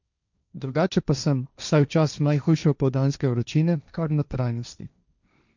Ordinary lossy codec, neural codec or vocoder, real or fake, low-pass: none; codec, 16 kHz, 1.1 kbps, Voila-Tokenizer; fake; 7.2 kHz